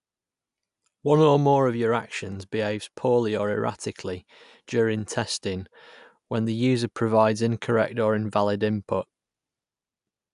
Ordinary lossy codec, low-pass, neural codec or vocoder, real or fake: AAC, 96 kbps; 10.8 kHz; none; real